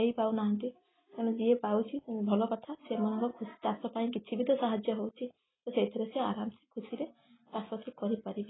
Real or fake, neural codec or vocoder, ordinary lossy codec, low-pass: real; none; AAC, 16 kbps; 7.2 kHz